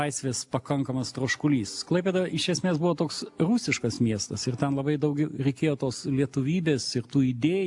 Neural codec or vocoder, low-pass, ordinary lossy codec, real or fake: none; 10.8 kHz; AAC, 64 kbps; real